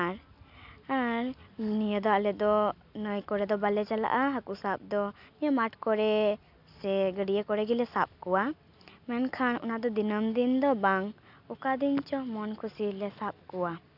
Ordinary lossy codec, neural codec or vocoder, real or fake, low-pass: none; none; real; 5.4 kHz